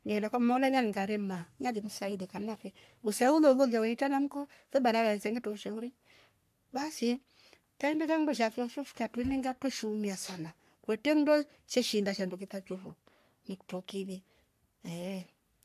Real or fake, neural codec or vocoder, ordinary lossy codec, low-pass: fake; codec, 44.1 kHz, 3.4 kbps, Pupu-Codec; AAC, 96 kbps; 14.4 kHz